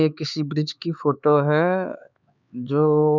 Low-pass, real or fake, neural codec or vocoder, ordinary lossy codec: 7.2 kHz; fake; codec, 16 kHz, 4 kbps, X-Codec, HuBERT features, trained on LibriSpeech; none